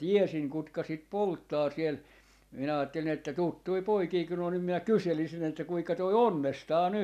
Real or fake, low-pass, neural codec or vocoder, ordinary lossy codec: real; 14.4 kHz; none; none